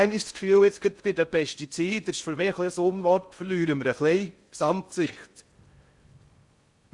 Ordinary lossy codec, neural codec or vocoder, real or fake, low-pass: Opus, 64 kbps; codec, 16 kHz in and 24 kHz out, 0.6 kbps, FocalCodec, streaming, 4096 codes; fake; 10.8 kHz